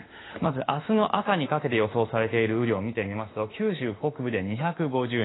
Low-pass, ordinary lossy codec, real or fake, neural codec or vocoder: 7.2 kHz; AAC, 16 kbps; fake; autoencoder, 48 kHz, 32 numbers a frame, DAC-VAE, trained on Japanese speech